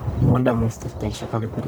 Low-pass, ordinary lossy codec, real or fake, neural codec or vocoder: none; none; fake; codec, 44.1 kHz, 1.7 kbps, Pupu-Codec